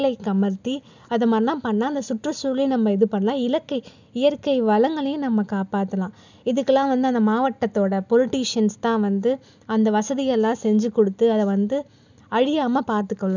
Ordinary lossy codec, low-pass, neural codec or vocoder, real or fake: none; 7.2 kHz; none; real